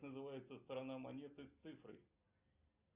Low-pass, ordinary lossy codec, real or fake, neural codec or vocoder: 3.6 kHz; Opus, 64 kbps; real; none